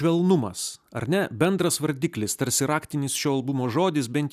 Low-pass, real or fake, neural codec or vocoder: 14.4 kHz; real; none